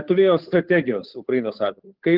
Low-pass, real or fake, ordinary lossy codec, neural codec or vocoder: 5.4 kHz; fake; Opus, 24 kbps; codec, 16 kHz, 8 kbps, FunCodec, trained on Chinese and English, 25 frames a second